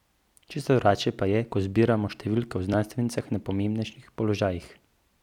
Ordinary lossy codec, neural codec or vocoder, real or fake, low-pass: none; vocoder, 44.1 kHz, 128 mel bands every 256 samples, BigVGAN v2; fake; 19.8 kHz